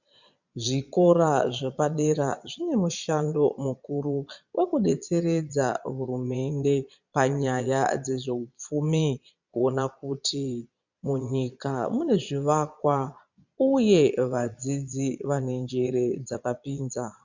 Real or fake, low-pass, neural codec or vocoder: fake; 7.2 kHz; vocoder, 22.05 kHz, 80 mel bands, Vocos